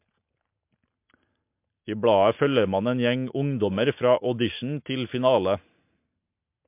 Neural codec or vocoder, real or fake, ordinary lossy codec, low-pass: none; real; MP3, 32 kbps; 3.6 kHz